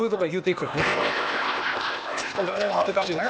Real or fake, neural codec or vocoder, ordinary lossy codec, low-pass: fake; codec, 16 kHz, 0.8 kbps, ZipCodec; none; none